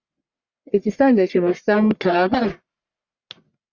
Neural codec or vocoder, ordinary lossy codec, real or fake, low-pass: codec, 44.1 kHz, 1.7 kbps, Pupu-Codec; Opus, 64 kbps; fake; 7.2 kHz